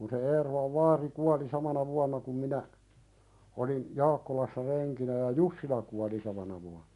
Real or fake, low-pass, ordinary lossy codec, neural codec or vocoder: real; 10.8 kHz; none; none